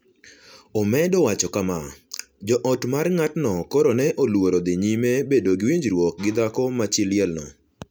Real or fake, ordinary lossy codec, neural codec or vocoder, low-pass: real; none; none; none